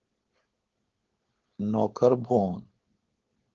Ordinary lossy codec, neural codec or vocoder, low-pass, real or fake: Opus, 16 kbps; codec, 16 kHz, 4.8 kbps, FACodec; 7.2 kHz; fake